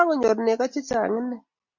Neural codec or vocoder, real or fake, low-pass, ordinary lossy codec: none; real; 7.2 kHz; Opus, 64 kbps